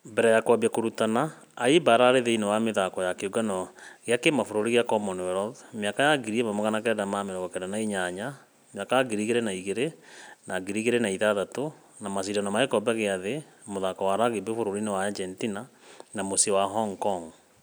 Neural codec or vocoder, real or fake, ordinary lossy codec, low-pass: none; real; none; none